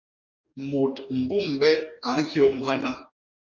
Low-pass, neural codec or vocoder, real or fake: 7.2 kHz; codec, 44.1 kHz, 2.6 kbps, DAC; fake